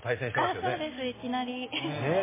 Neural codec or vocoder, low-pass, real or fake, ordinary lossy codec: none; 3.6 kHz; real; MP3, 16 kbps